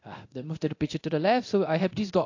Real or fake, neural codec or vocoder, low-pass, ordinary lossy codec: fake; codec, 24 kHz, 0.9 kbps, DualCodec; 7.2 kHz; AAC, 48 kbps